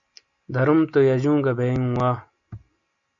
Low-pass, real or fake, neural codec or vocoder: 7.2 kHz; real; none